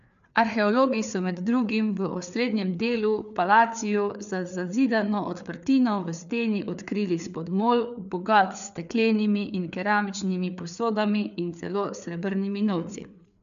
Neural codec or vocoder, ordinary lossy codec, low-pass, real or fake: codec, 16 kHz, 4 kbps, FreqCodec, larger model; none; 7.2 kHz; fake